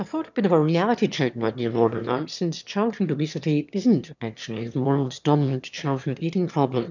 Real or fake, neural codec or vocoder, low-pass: fake; autoencoder, 22.05 kHz, a latent of 192 numbers a frame, VITS, trained on one speaker; 7.2 kHz